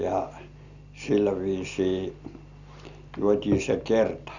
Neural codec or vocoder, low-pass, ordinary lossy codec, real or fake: none; 7.2 kHz; none; real